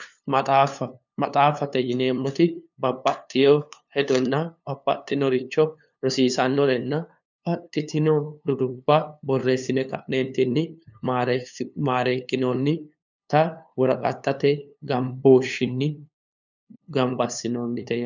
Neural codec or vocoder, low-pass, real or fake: codec, 16 kHz, 2 kbps, FunCodec, trained on LibriTTS, 25 frames a second; 7.2 kHz; fake